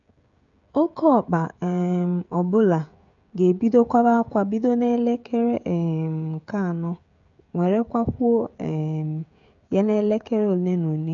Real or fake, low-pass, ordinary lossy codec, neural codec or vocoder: fake; 7.2 kHz; none; codec, 16 kHz, 16 kbps, FreqCodec, smaller model